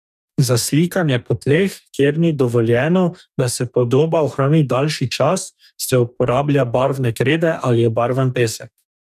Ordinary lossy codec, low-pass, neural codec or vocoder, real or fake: none; 14.4 kHz; codec, 44.1 kHz, 2.6 kbps, DAC; fake